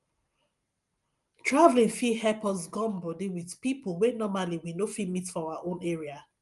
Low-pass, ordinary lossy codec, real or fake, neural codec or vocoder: 10.8 kHz; Opus, 32 kbps; real; none